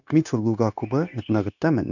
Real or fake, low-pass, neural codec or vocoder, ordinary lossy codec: fake; 7.2 kHz; codec, 16 kHz, 0.9 kbps, LongCat-Audio-Codec; none